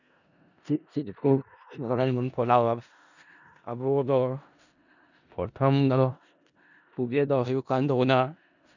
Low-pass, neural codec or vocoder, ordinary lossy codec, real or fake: 7.2 kHz; codec, 16 kHz in and 24 kHz out, 0.4 kbps, LongCat-Audio-Codec, four codebook decoder; none; fake